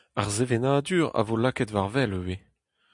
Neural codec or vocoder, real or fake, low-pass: none; real; 10.8 kHz